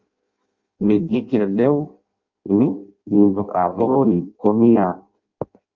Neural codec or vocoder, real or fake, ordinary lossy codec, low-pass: codec, 16 kHz in and 24 kHz out, 0.6 kbps, FireRedTTS-2 codec; fake; Opus, 32 kbps; 7.2 kHz